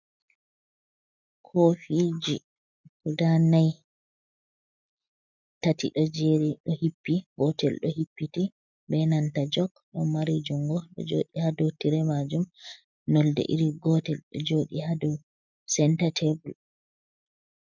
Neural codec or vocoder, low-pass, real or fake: none; 7.2 kHz; real